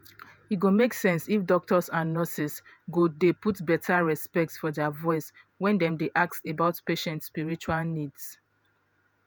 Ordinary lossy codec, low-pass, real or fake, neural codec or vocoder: none; none; fake; vocoder, 48 kHz, 128 mel bands, Vocos